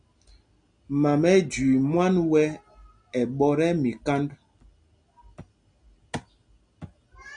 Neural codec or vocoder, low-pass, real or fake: none; 9.9 kHz; real